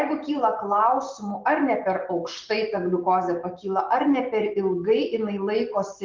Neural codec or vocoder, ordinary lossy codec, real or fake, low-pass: none; Opus, 32 kbps; real; 7.2 kHz